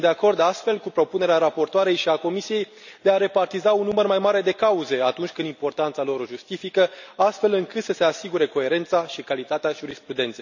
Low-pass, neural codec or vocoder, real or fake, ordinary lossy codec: 7.2 kHz; none; real; none